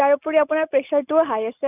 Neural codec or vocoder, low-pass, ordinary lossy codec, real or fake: none; 3.6 kHz; none; real